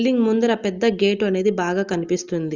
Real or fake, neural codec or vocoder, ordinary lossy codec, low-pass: real; none; Opus, 32 kbps; 7.2 kHz